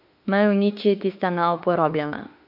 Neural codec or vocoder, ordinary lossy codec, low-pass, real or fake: autoencoder, 48 kHz, 32 numbers a frame, DAC-VAE, trained on Japanese speech; AAC, 48 kbps; 5.4 kHz; fake